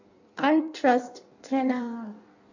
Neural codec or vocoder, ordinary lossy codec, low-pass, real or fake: codec, 16 kHz in and 24 kHz out, 1.1 kbps, FireRedTTS-2 codec; AAC, 48 kbps; 7.2 kHz; fake